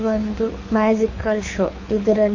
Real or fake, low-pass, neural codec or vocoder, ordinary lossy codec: fake; 7.2 kHz; codec, 24 kHz, 6 kbps, HILCodec; MP3, 32 kbps